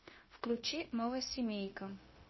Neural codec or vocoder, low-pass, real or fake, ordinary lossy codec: codec, 16 kHz, 0.9 kbps, LongCat-Audio-Codec; 7.2 kHz; fake; MP3, 24 kbps